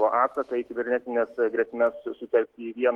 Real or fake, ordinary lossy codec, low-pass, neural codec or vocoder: fake; Opus, 16 kbps; 19.8 kHz; autoencoder, 48 kHz, 128 numbers a frame, DAC-VAE, trained on Japanese speech